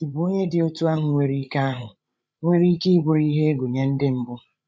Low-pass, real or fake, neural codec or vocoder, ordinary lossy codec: none; fake; codec, 16 kHz, 8 kbps, FreqCodec, larger model; none